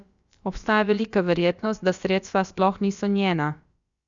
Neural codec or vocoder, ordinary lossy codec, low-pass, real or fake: codec, 16 kHz, about 1 kbps, DyCAST, with the encoder's durations; Opus, 64 kbps; 7.2 kHz; fake